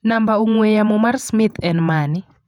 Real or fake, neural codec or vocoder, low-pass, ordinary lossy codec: fake; vocoder, 48 kHz, 128 mel bands, Vocos; 19.8 kHz; none